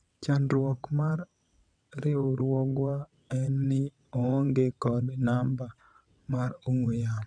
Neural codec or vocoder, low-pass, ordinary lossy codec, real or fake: vocoder, 22.05 kHz, 80 mel bands, WaveNeXt; 9.9 kHz; none; fake